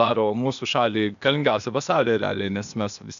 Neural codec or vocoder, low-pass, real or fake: codec, 16 kHz, 0.8 kbps, ZipCodec; 7.2 kHz; fake